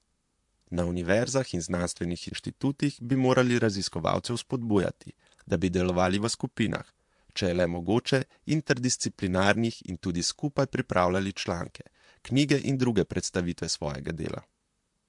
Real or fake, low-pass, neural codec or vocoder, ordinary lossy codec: fake; 10.8 kHz; vocoder, 44.1 kHz, 128 mel bands, Pupu-Vocoder; MP3, 64 kbps